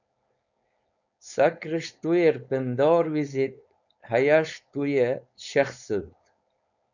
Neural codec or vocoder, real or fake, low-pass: codec, 16 kHz, 4.8 kbps, FACodec; fake; 7.2 kHz